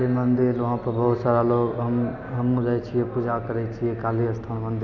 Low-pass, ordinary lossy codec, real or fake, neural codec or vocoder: 7.2 kHz; none; real; none